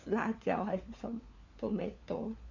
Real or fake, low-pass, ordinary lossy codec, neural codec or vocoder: fake; 7.2 kHz; none; codec, 16 kHz, 4 kbps, FunCodec, trained on LibriTTS, 50 frames a second